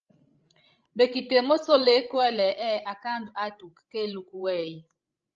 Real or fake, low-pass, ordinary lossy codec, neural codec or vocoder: fake; 7.2 kHz; Opus, 24 kbps; codec, 16 kHz, 16 kbps, FreqCodec, larger model